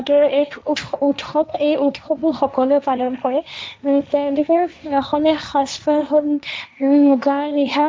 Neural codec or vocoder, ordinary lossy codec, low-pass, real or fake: codec, 16 kHz, 1.1 kbps, Voila-Tokenizer; none; none; fake